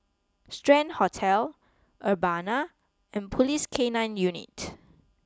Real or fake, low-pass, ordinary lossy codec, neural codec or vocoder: real; none; none; none